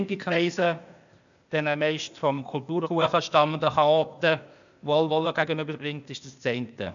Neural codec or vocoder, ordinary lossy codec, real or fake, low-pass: codec, 16 kHz, 0.8 kbps, ZipCodec; none; fake; 7.2 kHz